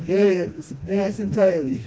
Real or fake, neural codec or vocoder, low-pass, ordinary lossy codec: fake; codec, 16 kHz, 1 kbps, FreqCodec, smaller model; none; none